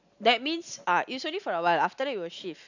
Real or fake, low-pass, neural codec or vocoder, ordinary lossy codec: real; 7.2 kHz; none; none